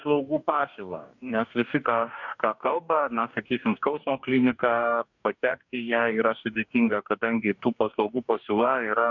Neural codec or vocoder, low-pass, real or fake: codec, 44.1 kHz, 2.6 kbps, DAC; 7.2 kHz; fake